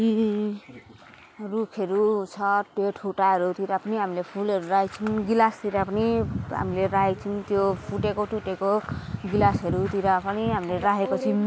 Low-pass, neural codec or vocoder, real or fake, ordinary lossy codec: none; none; real; none